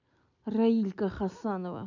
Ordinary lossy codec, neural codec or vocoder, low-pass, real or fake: none; none; 7.2 kHz; real